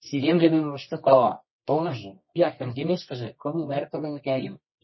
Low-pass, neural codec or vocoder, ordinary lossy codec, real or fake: 7.2 kHz; codec, 24 kHz, 0.9 kbps, WavTokenizer, medium music audio release; MP3, 24 kbps; fake